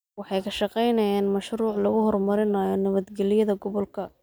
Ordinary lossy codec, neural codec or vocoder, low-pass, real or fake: none; none; none; real